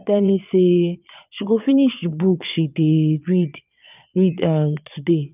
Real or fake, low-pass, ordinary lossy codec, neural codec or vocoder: fake; 3.6 kHz; none; vocoder, 22.05 kHz, 80 mel bands, Vocos